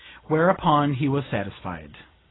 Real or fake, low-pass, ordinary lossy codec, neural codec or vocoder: real; 7.2 kHz; AAC, 16 kbps; none